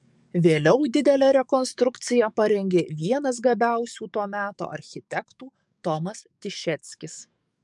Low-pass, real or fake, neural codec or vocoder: 10.8 kHz; fake; codec, 44.1 kHz, 7.8 kbps, DAC